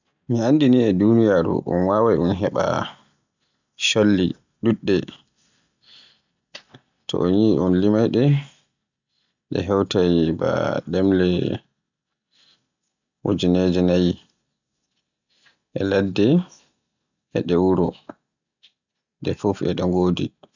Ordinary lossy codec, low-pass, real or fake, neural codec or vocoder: none; 7.2 kHz; real; none